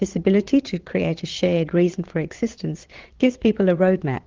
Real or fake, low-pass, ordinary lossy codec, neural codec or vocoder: fake; 7.2 kHz; Opus, 16 kbps; vocoder, 22.05 kHz, 80 mel bands, Vocos